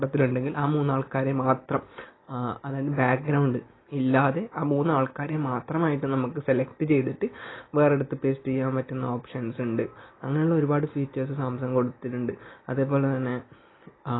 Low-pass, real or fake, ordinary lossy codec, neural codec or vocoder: 7.2 kHz; real; AAC, 16 kbps; none